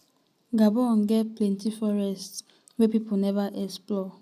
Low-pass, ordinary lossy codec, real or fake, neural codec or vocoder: 14.4 kHz; none; real; none